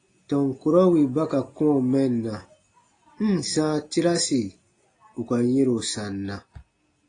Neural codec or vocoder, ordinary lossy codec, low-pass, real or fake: none; AAC, 32 kbps; 9.9 kHz; real